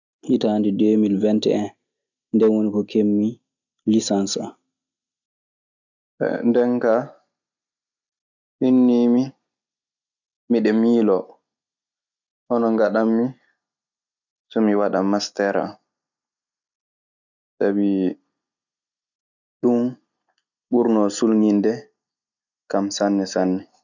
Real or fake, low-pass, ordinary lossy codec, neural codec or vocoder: real; 7.2 kHz; none; none